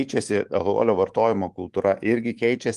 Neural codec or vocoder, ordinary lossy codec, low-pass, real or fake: codec, 24 kHz, 3.1 kbps, DualCodec; Opus, 24 kbps; 10.8 kHz; fake